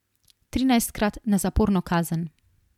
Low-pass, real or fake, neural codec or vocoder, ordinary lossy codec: 19.8 kHz; real; none; MP3, 96 kbps